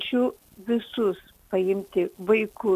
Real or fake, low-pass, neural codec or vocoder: real; 14.4 kHz; none